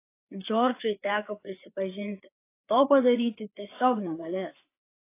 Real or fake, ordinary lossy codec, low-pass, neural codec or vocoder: fake; AAC, 24 kbps; 3.6 kHz; codec, 16 kHz, 4 kbps, FreqCodec, larger model